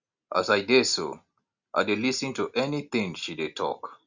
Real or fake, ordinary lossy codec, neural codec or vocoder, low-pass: real; Opus, 64 kbps; none; 7.2 kHz